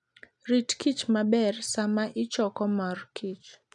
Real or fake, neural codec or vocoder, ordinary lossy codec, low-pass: real; none; none; 10.8 kHz